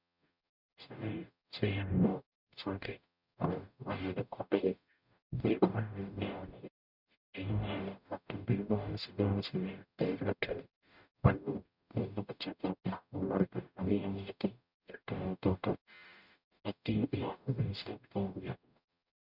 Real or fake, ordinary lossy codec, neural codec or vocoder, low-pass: fake; none; codec, 44.1 kHz, 0.9 kbps, DAC; 5.4 kHz